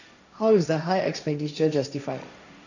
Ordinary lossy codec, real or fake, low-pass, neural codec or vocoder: none; fake; 7.2 kHz; codec, 16 kHz, 1.1 kbps, Voila-Tokenizer